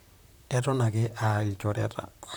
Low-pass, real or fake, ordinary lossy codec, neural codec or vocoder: none; fake; none; vocoder, 44.1 kHz, 128 mel bands, Pupu-Vocoder